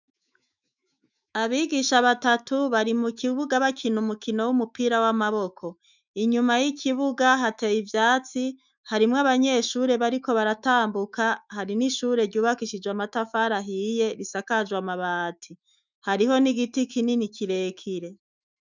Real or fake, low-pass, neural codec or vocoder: fake; 7.2 kHz; autoencoder, 48 kHz, 128 numbers a frame, DAC-VAE, trained on Japanese speech